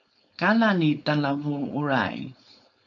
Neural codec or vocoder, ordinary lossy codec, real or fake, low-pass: codec, 16 kHz, 4.8 kbps, FACodec; MP3, 48 kbps; fake; 7.2 kHz